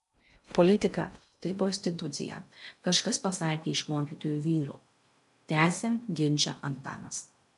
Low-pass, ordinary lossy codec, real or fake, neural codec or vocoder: 10.8 kHz; AAC, 96 kbps; fake; codec, 16 kHz in and 24 kHz out, 0.6 kbps, FocalCodec, streaming, 2048 codes